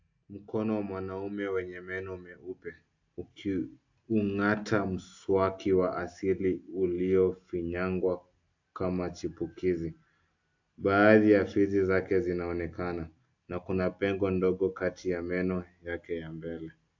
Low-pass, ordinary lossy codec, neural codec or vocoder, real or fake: 7.2 kHz; AAC, 48 kbps; none; real